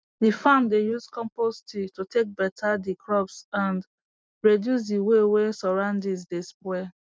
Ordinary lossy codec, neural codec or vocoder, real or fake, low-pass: none; none; real; none